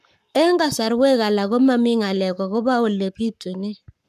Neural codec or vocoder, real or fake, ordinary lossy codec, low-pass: codec, 44.1 kHz, 7.8 kbps, Pupu-Codec; fake; none; 14.4 kHz